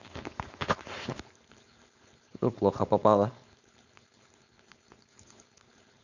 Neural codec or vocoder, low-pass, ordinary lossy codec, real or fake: codec, 16 kHz, 4.8 kbps, FACodec; 7.2 kHz; none; fake